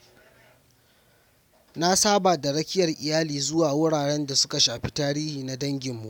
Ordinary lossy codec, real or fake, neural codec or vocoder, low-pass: none; real; none; none